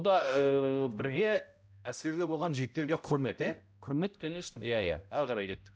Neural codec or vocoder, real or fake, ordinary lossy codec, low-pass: codec, 16 kHz, 0.5 kbps, X-Codec, HuBERT features, trained on balanced general audio; fake; none; none